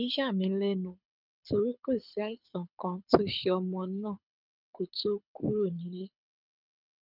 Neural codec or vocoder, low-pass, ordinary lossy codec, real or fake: codec, 24 kHz, 6 kbps, HILCodec; 5.4 kHz; none; fake